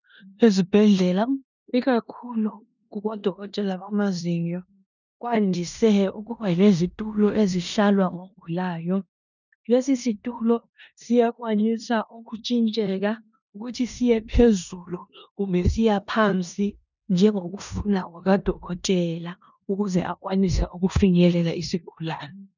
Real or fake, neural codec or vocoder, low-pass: fake; codec, 16 kHz in and 24 kHz out, 0.9 kbps, LongCat-Audio-Codec, four codebook decoder; 7.2 kHz